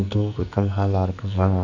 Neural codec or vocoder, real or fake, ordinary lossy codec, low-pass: codec, 44.1 kHz, 2.6 kbps, SNAC; fake; none; 7.2 kHz